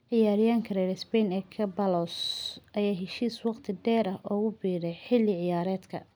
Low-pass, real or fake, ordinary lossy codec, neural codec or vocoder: none; real; none; none